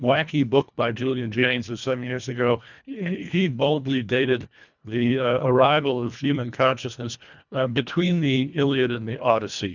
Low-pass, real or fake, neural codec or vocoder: 7.2 kHz; fake; codec, 24 kHz, 1.5 kbps, HILCodec